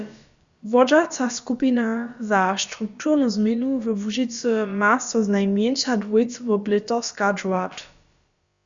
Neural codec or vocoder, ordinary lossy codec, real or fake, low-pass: codec, 16 kHz, about 1 kbps, DyCAST, with the encoder's durations; Opus, 64 kbps; fake; 7.2 kHz